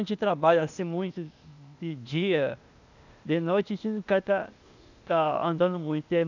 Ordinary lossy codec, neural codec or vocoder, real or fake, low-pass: none; codec, 16 kHz, 0.8 kbps, ZipCodec; fake; 7.2 kHz